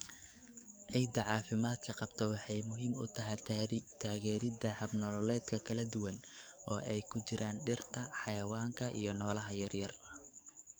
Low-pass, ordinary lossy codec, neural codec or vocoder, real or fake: none; none; codec, 44.1 kHz, 7.8 kbps, DAC; fake